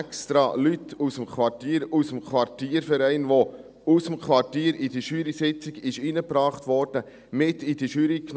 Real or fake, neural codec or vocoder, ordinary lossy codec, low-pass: real; none; none; none